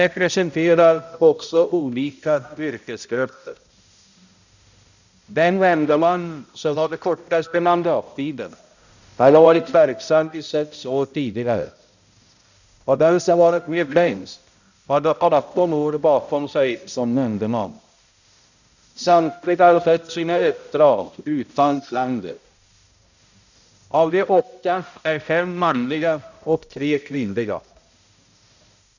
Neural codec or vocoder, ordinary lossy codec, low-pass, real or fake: codec, 16 kHz, 0.5 kbps, X-Codec, HuBERT features, trained on balanced general audio; none; 7.2 kHz; fake